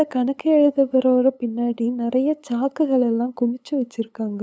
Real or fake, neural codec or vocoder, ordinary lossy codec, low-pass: fake; codec, 16 kHz, 8 kbps, FunCodec, trained on LibriTTS, 25 frames a second; none; none